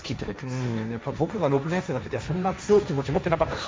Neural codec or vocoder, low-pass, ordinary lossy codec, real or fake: codec, 16 kHz, 1.1 kbps, Voila-Tokenizer; none; none; fake